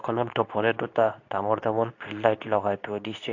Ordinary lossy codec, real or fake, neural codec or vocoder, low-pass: AAC, 48 kbps; fake; codec, 24 kHz, 0.9 kbps, WavTokenizer, medium speech release version 2; 7.2 kHz